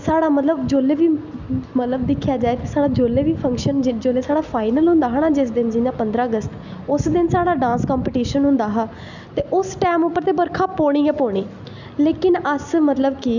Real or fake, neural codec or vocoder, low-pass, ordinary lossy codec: real; none; 7.2 kHz; none